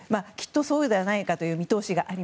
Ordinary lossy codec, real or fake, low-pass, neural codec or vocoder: none; real; none; none